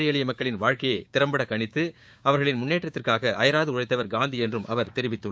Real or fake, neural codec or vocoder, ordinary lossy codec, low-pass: fake; codec, 16 kHz, 6 kbps, DAC; none; none